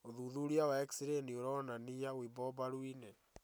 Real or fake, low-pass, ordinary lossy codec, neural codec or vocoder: real; none; none; none